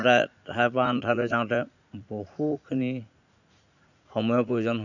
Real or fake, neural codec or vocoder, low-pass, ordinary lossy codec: fake; vocoder, 44.1 kHz, 80 mel bands, Vocos; 7.2 kHz; none